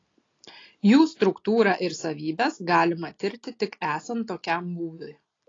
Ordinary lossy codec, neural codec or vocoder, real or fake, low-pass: AAC, 32 kbps; none; real; 7.2 kHz